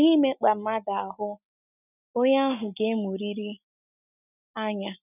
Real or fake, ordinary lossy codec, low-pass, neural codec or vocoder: real; none; 3.6 kHz; none